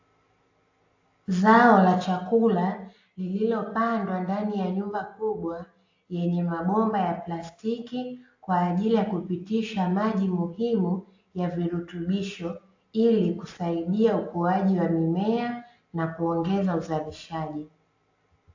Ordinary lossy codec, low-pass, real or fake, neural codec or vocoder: AAC, 48 kbps; 7.2 kHz; real; none